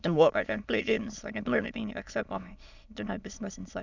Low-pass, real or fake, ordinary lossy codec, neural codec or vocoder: 7.2 kHz; fake; none; autoencoder, 22.05 kHz, a latent of 192 numbers a frame, VITS, trained on many speakers